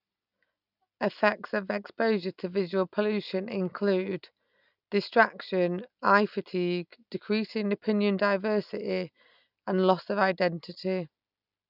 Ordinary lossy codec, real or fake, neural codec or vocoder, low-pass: none; real; none; 5.4 kHz